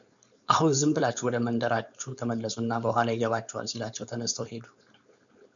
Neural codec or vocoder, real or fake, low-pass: codec, 16 kHz, 4.8 kbps, FACodec; fake; 7.2 kHz